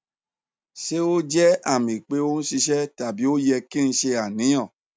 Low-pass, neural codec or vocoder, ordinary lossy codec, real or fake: none; none; none; real